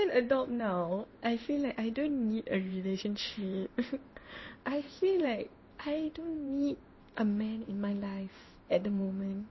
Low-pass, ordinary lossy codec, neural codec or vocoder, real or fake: 7.2 kHz; MP3, 24 kbps; codec, 16 kHz in and 24 kHz out, 1 kbps, XY-Tokenizer; fake